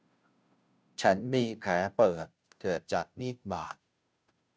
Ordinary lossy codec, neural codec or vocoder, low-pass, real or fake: none; codec, 16 kHz, 0.5 kbps, FunCodec, trained on Chinese and English, 25 frames a second; none; fake